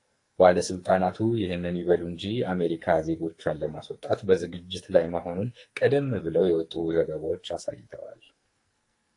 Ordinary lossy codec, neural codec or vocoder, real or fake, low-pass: AAC, 48 kbps; codec, 44.1 kHz, 2.6 kbps, SNAC; fake; 10.8 kHz